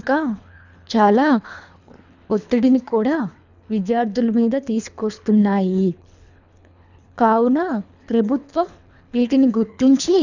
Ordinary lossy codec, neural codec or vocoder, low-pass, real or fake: none; codec, 24 kHz, 3 kbps, HILCodec; 7.2 kHz; fake